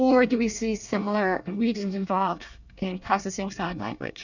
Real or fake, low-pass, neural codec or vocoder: fake; 7.2 kHz; codec, 24 kHz, 1 kbps, SNAC